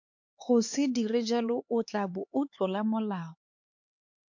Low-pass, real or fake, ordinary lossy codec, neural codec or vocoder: 7.2 kHz; fake; MP3, 48 kbps; codec, 16 kHz, 4 kbps, X-Codec, HuBERT features, trained on LibriSpeech